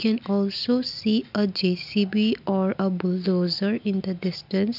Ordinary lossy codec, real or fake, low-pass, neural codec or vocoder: none; real; 5.4 kHz; none